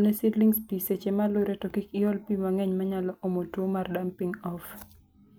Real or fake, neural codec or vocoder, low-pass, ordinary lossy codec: real; none; none; none